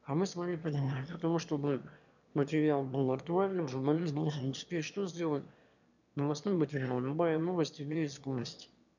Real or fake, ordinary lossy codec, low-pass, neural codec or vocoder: fake; none; 7.2 kHz; autoencoder, 22.05 kHz, a latent of 192 numbers a frame, VITS, trained on one speaker